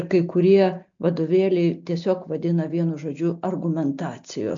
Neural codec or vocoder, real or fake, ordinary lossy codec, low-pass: none; real; MP3, 48 kbps; 7.2 kHz